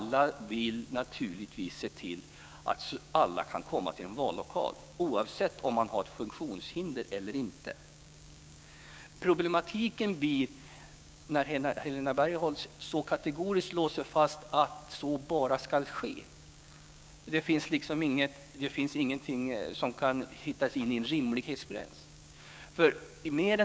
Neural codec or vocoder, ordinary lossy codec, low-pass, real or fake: codec, 16 kHz, 6 kbps, DAC; none; none; fake